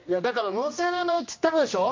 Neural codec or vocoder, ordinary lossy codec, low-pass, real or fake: codec, 16 kHz, 1 kbps, X-Codec, HuBERT features, trained on general audio; MP3, 48 kbps; 7.2 kHz; fake